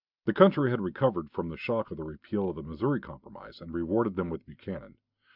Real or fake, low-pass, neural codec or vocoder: real; 5.4 kHz; none